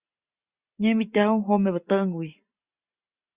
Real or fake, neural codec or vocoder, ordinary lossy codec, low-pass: real; none; Opus, 64 kbps; 3.6 kHz